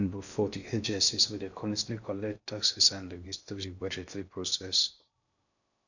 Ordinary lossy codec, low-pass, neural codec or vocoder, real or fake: none; 7.2 kHz; codec, 16 kHz in and 24 kHz out, 0.6 kbps, FocalCodec, streaming, 2048 codes; fake